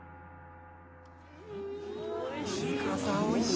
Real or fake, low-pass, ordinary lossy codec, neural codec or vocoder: real; none; none; none